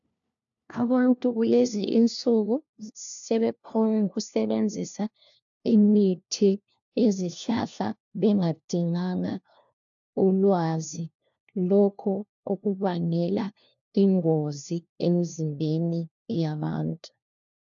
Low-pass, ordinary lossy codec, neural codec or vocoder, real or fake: 7.2 kHz; AAC, 64 kbps; codec, 16 kHz, 1 kbps, FunCodec, trained on LibriTTS, 50 frames a second; fake